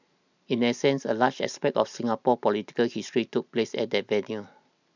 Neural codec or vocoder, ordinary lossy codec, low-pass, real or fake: none; none; 7.2 kHz; real